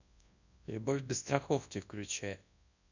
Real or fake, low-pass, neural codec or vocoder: fake; 7.2 kHz; codec, 24 kHz, 0.9 kbps, WavTokenizer, large speech release